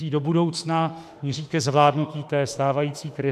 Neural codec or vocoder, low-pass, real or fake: autoencoder, 48 kHz, 32 numbers a frame, DAC-VAE, trained on Japanese speech; 14.4 kHz; fake